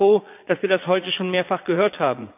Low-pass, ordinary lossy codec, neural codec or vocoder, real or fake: 3.6 kHz; none; vocoder, 22.05 kHz, 80 mel bands, Vocos; fake